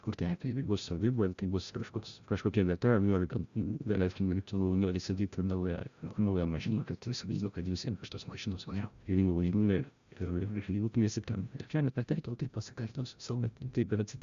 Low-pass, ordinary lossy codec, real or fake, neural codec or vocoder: 7.2 kHz; Opus, 64 kbps; fake; codec, 16 kHz, 0.5 kbps, FreqCodec, larger model